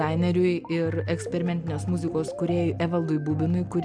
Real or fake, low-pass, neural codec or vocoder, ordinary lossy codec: real; 9.9 kHz; none; MP3, 96 kbps